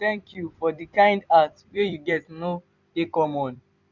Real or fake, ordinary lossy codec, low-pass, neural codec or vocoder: fake; none; 7.2 kHz; vocoder, 44.1 kHz, 128 mel bands every 256 samples, BigVGAN v2